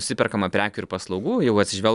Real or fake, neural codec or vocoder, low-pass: real; none; 10.8 kHz